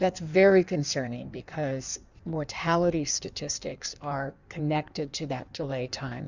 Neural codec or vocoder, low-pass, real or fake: codec, 16 kHz in and 24 kHz out, 1.1 kbps, FireRedTTS-2 codec; 7.2 kHz; fake